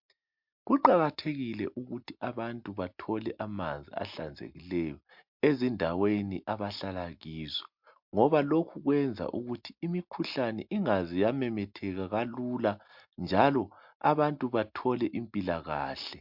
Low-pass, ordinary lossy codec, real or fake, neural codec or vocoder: 5.4 kHz; MP3, 48 kbps; real; none